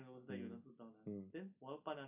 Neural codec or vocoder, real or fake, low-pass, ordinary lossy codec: none; real; 3.6 kHz; none